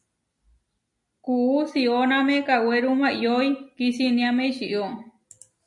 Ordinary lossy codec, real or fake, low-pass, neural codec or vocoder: MP3, 48 kbps; real; 10.8 kHz; none